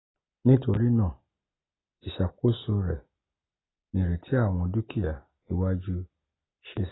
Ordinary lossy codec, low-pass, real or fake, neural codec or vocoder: AAC, 16 kbps; 7.2 kHz; real; none